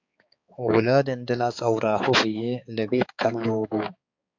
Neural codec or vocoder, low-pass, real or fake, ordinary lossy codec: codec, 16 kHz, 4 kbps, X-Codec, HuBERT features, trained on balanced general audio; 7.2 kHz; fake; AAC, 48 kbps